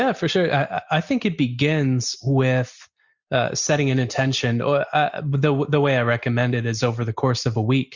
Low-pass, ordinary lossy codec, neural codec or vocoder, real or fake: 7.2 kHz; Opus, 64 kbps; none; real